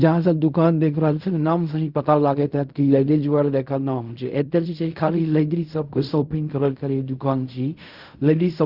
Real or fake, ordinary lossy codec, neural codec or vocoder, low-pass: fake; Opus, 64 kbps; codec, 16 kHz in and 24 kHz out, 0.4 kbps, LongCat-Audio-Codec, fine tuned four codebook decoder; 5.4 kHz